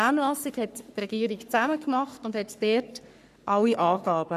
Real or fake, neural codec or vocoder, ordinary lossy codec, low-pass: fake; codec, 44.1 kHz, 3.4 kbps, Pupu-Codec; none; 14.4 kHz